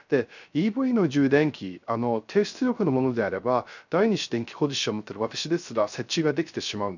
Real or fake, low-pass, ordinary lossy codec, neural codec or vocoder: fake; 7.2 kHz; none; codec, 16 kHz, 0.3 kbps, FocalCodec